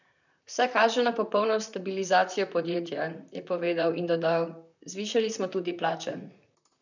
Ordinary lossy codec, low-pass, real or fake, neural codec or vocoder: none; 7.2 kHz; fake; vocoder, 44.1 kHz, 128 mel bands, Pupu-Vocoder